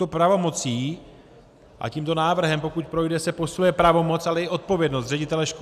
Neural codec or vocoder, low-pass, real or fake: none; 14.4 kHz; real